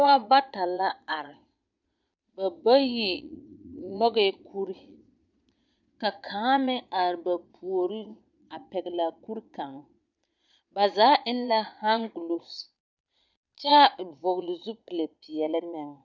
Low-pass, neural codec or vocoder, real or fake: 7.2 kHz; vocoder, 22.05 kHz, 80 mel bands, Vocos; fake